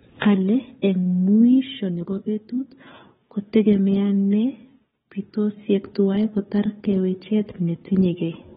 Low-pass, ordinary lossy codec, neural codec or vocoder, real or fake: 7.2 kHz; AAC, 16 kbps; codec, 16 kHz, 4 kbps, FunCodec, trained on Chinese and English, 50 frames a second; fake